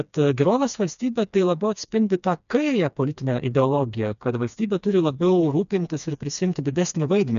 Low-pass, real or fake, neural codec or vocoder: 7.2 kHz; fake; codec, 16 kHz, 2 kbps, FreqCodec, smaller model